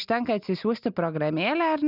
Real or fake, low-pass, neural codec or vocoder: real; 5.4 kHz; none